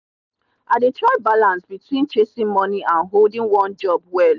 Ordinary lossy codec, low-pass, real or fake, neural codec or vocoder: none; 7.2 kHz; real; none